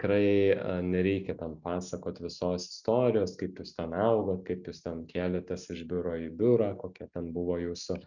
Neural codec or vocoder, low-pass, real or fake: none; 7.2 kHz; real